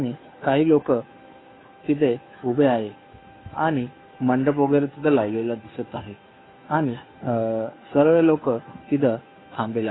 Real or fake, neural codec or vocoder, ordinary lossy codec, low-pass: fake; codec, 16 kHz, 2 kbps, FunCodec, trained on Chinese and English, 25 frames a second; AAC, 16 kbps; 7.2 kHz